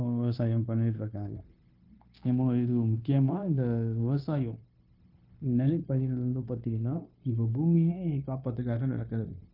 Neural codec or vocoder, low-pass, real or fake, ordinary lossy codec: codec, 16 kHz, 0.9 kbps, LongCat-Audio-Codec; 5.4 kHz; fake; Opus, 32 kbps